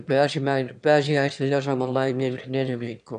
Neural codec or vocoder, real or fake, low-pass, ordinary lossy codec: autoencoder, 22.05 kHz, a latent of 192 numbers a frame, VITS, trained on one speaker; fake; 9.9 kHz; none